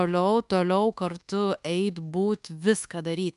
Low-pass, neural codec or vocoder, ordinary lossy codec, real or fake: 10.8 kHz; codec, 24 kHz, 1.2 kbps, DualCodec; MP3, 96 kbps; fake